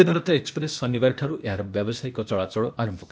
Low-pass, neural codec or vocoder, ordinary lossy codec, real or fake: none; codec, 16 kHz, 0.8 kbps, ZipCodec; none; fake